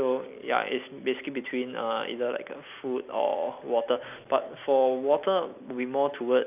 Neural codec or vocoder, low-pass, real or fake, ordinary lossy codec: none; 3.6 kHz; real; none